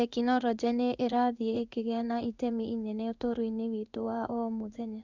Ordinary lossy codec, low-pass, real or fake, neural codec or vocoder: none; 7.2 kHz; fake; codec, 16 kHz in and 24 kHz out, 1 kbps, XY-Tokenizer